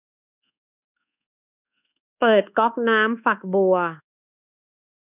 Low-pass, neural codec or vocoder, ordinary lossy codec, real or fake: 3.6 kHz; codec, 24 kHz, 1.2 kbps, DualCodec; none; fake